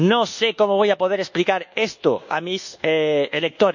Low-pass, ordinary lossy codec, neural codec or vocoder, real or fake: 7.2 kHz; none; codec, 24 kHz, 1.2 kbps, DualCodec; fake